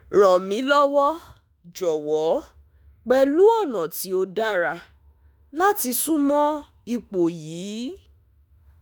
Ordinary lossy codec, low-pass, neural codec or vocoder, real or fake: none; none; autoencoder, 48 kHz, 32 numbers a frame, DAC-VAE, trained on Japanese speech; fake